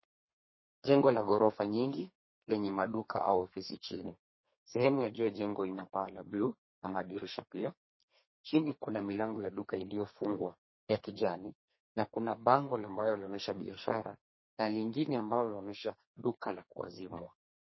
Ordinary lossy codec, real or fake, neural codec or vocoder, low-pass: MP3, 24 kbps; fake; codec, 32 kHz, 1.9 kbps, SNAC; 7.2 kHz